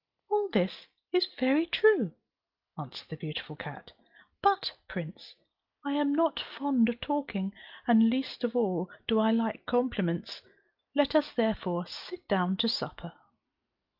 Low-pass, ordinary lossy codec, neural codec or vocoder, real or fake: 5.4 kHz; Opus, 24 kbps; none; real